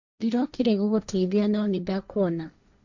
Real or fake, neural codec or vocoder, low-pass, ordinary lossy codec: fake; codec, 16 kHz, 1.1 kbps, Voila-Tokenizer; 7.2 kHz; none